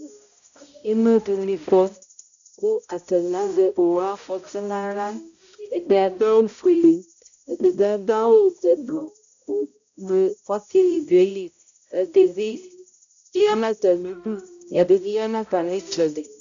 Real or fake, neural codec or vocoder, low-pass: fake; codec, 16 kHz, 0.5 kbps, X-Codec, HuBERT features, trained on balanced general audio; 7.2 kHz